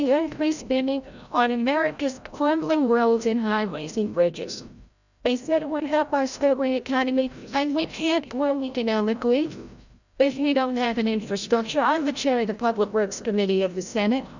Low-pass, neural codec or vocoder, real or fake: 7.2 kHz; codec, 16 kHz, 0.5 kbps, FreqCodec, larger model; fake